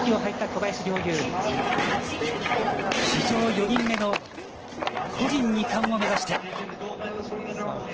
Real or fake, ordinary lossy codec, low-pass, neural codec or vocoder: real; Opus, 16 kbps; 7.2 kHz; none